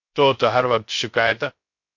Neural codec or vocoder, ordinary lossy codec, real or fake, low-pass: codec, 16 kHz, 0.3 kbps, FocalCodec; MP3, 48 kbps; fake; 7.2 kHz